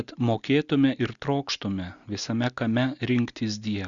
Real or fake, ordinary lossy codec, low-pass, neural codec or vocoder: real; Opus, 64 kbps; 7.2 kHz; none